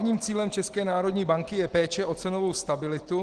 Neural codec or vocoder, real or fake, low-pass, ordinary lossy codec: none; real; 9.9 kHz; Opus, 16 kbps